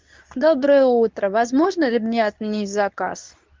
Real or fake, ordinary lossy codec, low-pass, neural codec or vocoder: fake; Opus, 32 kbps; 7.2 kHz; codec, 24 kHz, 0.9 kbps, WavTokenizer, medium speech release version 2